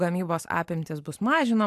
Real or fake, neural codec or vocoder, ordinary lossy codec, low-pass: real; none; AAC, 96 kbps; 14.4 kHz